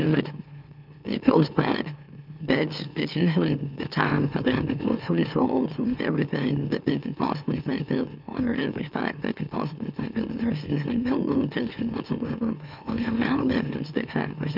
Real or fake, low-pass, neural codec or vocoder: fake; 5.4 kHz; autoencoder, 44.1 kHz, a latent of 192 numbers a frame, MeloTTS